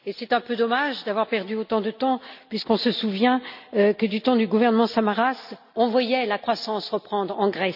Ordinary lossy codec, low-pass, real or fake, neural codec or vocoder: none; 5.4 kHz; real; none